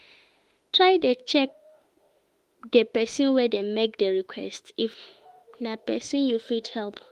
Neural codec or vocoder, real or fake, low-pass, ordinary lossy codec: autoencoder, 48 kHz, 32 numbers a frame, DAC-VAE, trained on Japanese speech; fake; 14.4 kHz; Opus, 24 kbps